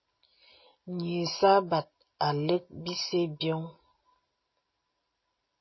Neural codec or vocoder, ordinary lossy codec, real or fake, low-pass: none; MP3, 24 kbps; real; 7.2 kHz